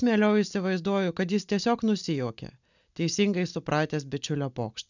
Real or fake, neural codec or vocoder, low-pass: real; none; 7.2 kHz